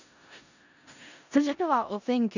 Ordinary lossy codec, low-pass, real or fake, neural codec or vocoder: none; 7.2 kHz; fake; codec, 16 kHz in and 24 kHz out, 0.4 kbps, LongCat-Audio-Codec, four codebook decoder